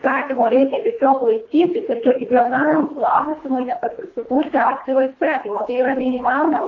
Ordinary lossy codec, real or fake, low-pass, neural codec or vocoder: AAC, 32 kbps; fake; 7.2 kHz; codec, 24 kHz, 1.5 kbps, HILCodec